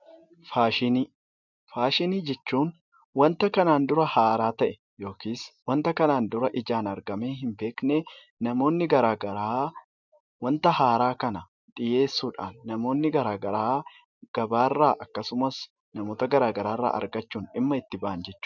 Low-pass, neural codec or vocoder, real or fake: 7.2 kHz; none; real